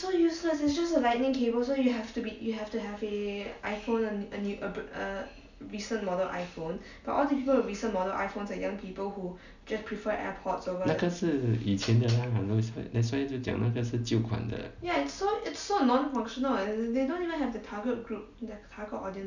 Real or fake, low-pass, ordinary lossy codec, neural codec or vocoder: real; 7.2 kHz; none; none